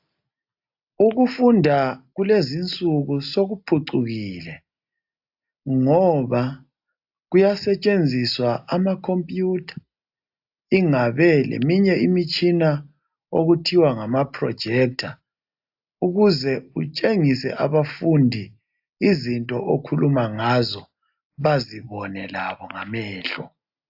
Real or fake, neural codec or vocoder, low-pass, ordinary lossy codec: real; none; 5.4 kHz; AAC, 48 kbps